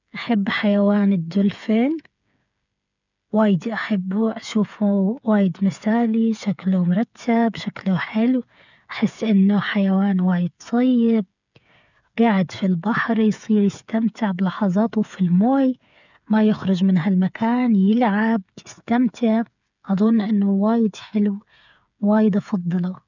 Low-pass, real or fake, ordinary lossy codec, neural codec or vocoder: 7.2 kHz; fake; none; codec, 16 kHz, 8 kbps, FreqCodec, smaller model